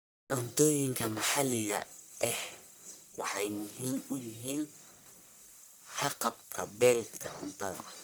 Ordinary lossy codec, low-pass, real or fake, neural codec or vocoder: none; none; fake; codec, 44.1 kHz, 1.7 kbps, Pupu-Codec